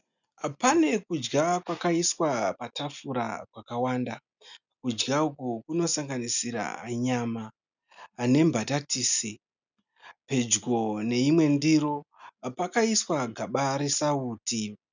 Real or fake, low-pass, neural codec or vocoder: real; 7.2 kHz; none